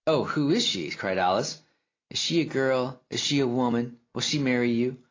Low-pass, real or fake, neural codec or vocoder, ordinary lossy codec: 7.2 kHz; real; none; AAC, 32 kbps